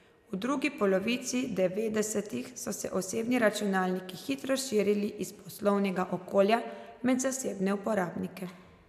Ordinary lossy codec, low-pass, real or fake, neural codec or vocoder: none; 14.4 kHz; real; none